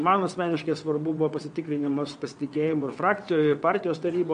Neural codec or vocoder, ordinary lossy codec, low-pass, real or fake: vocoder, 22.05 kHz, 80 mel bands, WaveNeXt; MP3, 64 kbps; 9.9 kHz; fake